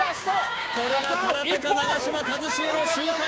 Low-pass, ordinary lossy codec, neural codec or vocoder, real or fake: none; none; codec, 16 kHz, 6 kbps, DAC; fake